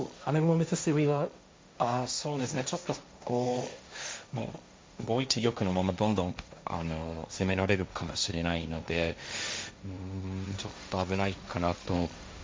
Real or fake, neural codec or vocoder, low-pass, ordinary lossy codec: fake; codec, 16 kHz, 1.1 kbps, Voila-Tokenizer; none; none